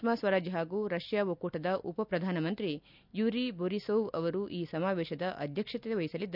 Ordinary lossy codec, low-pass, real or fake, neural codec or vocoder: none; 5.4 kHz; real; none